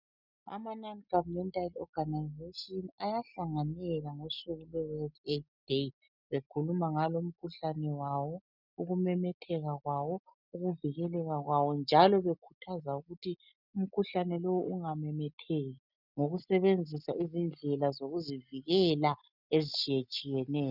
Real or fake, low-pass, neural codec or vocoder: real; 5.4 kHz; none